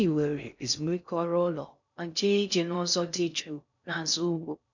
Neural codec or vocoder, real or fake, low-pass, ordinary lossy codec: codec, 16 kHz in and 24 kHz out, 0.6 kbps, FocalCodec, streaming, 2048 codes; fake; 7.2 kHz; none